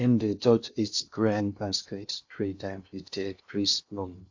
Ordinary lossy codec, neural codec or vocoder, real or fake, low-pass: AAC, 48 kbps; codec, 16 kHz in and 24 kHz out, 0.6 kbps, FocalCodec, streaming, 2048 codes; fake; 7.2 kHz